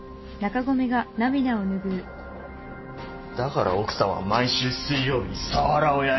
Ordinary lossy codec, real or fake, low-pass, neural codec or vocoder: MP3, 24 kbps; real; 7.2 kHz; none